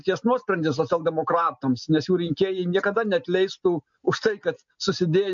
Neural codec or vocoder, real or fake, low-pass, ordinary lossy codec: none; real; 7.2 kHz; MP3, 48 kbps